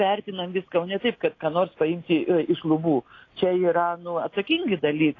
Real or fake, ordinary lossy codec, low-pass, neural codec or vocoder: real; AAC, 32 kbps; 7.2 kHz; none